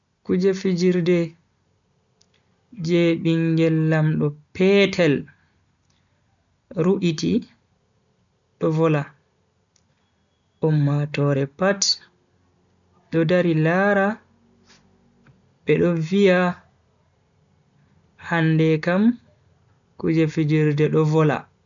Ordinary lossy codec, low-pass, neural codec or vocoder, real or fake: none; 7.2 kHz; none; real